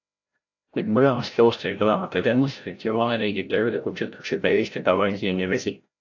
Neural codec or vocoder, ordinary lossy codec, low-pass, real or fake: codec, 16 kHz, 0.5 kbps, FreqCodec, larger model; AAC, 48 kbps; 7.2 kHz; fake